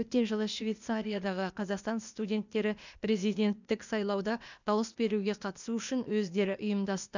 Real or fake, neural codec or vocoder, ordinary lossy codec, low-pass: fake; codec, 16 kHz, 0.8 kbps, ZipCodec; none; 7.2 kHz